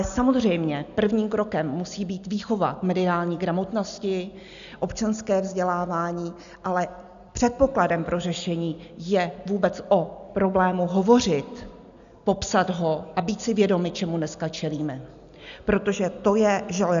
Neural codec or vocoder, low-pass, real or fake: none; 7.2 kHz; real